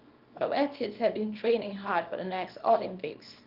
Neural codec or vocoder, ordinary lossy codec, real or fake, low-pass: codec, 24 kHz, 0.9 kbps, WavTokenizer, small release; Opus, 32 kbps; fake; 5.4 kHz